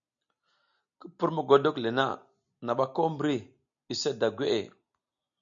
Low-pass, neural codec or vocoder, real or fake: 7.2 kHz; none; real